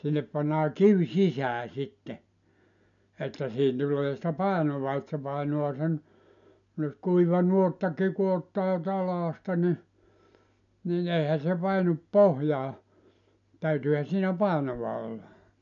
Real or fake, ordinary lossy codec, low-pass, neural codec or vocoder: real; none; 7.2 kHz; none